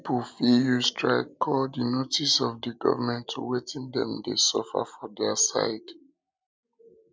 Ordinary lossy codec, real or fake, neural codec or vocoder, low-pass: none; real; none; none